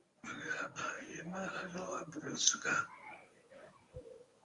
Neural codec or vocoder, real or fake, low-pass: codec, 24 kHz, 0.9 kbps, WavTokenizer, medium speech release version 1; fake; 10.8 kHz